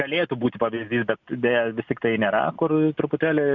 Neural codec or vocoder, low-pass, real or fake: none; 7.2 kHz; real